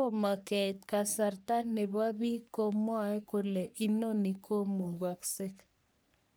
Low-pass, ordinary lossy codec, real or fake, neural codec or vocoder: none; none; fake; codec, 44.1 kHz, 3.4 kbps, Pupu-Codec